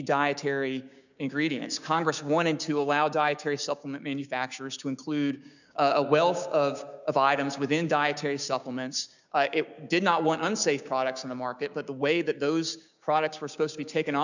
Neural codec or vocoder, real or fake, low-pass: codec, 16 kHz, 6 kbps, DAC; fake; 7.2 kHz